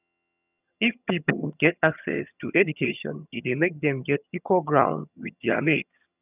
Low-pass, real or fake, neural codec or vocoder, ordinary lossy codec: 3.6 kHz; fake; vocoder, 22.05 kHz, 80 mel bands, HiFi-GAN; none